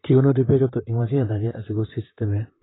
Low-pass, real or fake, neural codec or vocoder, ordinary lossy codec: 7.2 kHz; fake; vocoder, 44.1 kHz, 128 mel bands, Pupu-Vocoder; AAC, 16 kbps